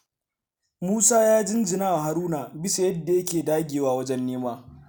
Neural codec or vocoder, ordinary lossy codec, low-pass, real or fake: none; none; none; real